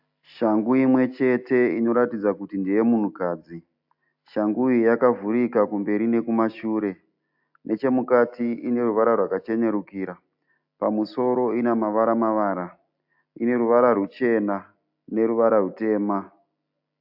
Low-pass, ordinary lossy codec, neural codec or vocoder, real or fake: 5.4 kHz; AAC, 48 kbps; none; real